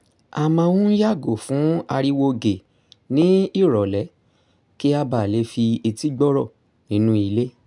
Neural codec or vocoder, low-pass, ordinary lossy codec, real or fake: none; 10.8 kHz; none; real